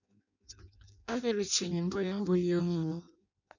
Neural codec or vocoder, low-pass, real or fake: codec, 16 kHz in and 24 kHz out, 0.6 kbps, FireRedTTS-2 codec; 7.2 kHz; fake